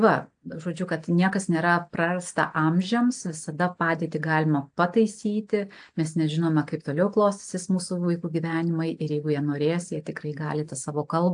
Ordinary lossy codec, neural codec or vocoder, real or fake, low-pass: AAC, 64 kbps; none; real; 9.9 kHz